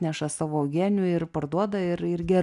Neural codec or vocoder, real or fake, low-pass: none; real; 10.8 kHz